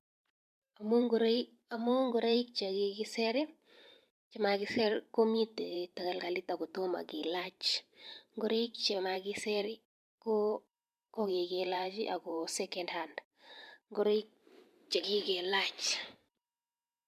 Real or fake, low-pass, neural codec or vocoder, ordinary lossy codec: fake; 14.4 kHz; vocoder, 44.1 kHz, 128 mel bands every 512 samples, BigVGAN v2; MP3, 96 kbps